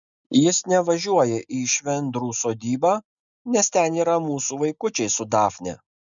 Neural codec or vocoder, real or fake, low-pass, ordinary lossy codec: none; real; 7.2 kHz; MP3, 96 kbps